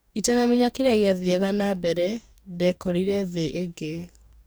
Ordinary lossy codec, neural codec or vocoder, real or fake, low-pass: none; codec, 44.1 kHz, 2.6 kbps, DAC; fake; none